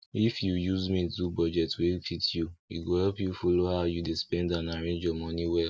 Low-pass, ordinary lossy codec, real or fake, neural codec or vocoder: none; none; real; none